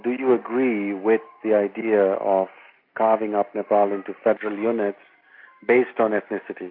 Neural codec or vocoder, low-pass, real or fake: none; 5.4 kHz; real